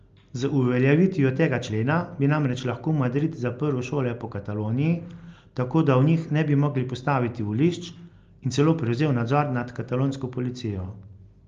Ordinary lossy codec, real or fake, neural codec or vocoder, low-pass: Opus, 32 kbps; real; none; 7.2 kHz